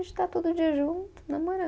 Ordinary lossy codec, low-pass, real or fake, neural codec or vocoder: none; none; real; none